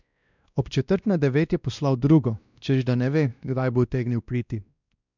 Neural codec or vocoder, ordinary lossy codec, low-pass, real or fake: codec, 16 kHz, 1 kbps, X-Codec, WavLM features, trained on Multilingual LibriSpeech; none; 7.2 kHz; fake